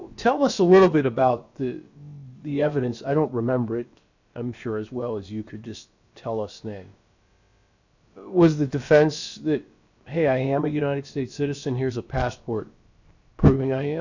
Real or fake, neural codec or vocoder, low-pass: fake; codec, 16 kHz, about 1 kbps, DyCAST, with the encoder's durations; 7.2 kHz